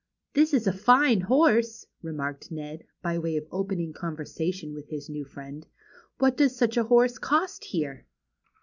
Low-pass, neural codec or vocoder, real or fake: 7.2 kHz; none; real